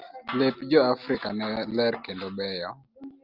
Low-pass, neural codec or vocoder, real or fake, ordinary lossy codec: 5.4 kHz; none; real; Opus, 24 kbps